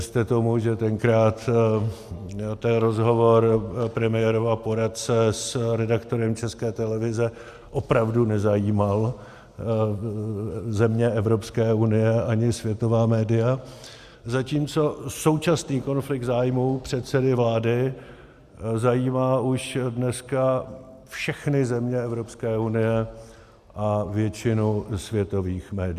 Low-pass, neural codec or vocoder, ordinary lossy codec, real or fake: 14.4 kHz; none; Opus, 64 kbps; real